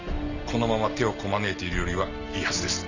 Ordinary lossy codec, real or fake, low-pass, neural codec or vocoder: none; real; 7.2 kHz; none